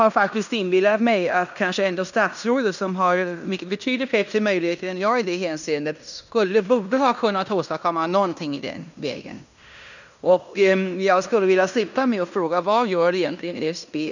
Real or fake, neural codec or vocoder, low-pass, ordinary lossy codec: fake; codec, 16 kHz in and 24 kHz out, 0.9 kbps, LongCat-Audio-Codec, fine tuned four codebook decoder; 7.2 kHz; none